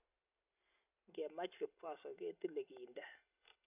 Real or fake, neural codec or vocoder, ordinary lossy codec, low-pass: real; none; none; 3.6 kHz